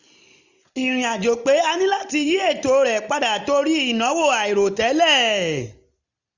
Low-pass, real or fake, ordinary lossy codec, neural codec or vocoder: 7.2 kHz; real; none; none